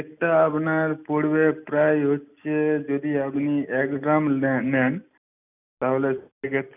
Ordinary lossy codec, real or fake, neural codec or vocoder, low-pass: none; real; none; 3.6 kHz